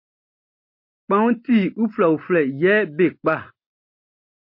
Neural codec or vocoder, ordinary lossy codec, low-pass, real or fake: none; MP3, 32 kbps; 5.4 kHz; real